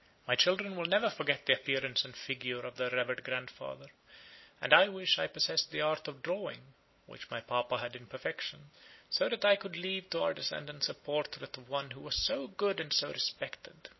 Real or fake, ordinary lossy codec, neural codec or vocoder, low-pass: real; MP3, 24 kbps; none; 7.2 kHz